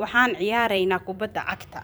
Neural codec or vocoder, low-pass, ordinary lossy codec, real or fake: vocoder, 44.1 kHz, 128 mel bands every 256 samples, BigVGAN v2; none; none; fake